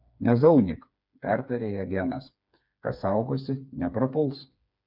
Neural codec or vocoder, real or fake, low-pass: codec, 16 kHz, 4 kbps, FreqCodec, smaller model; fake; 5.4 kHz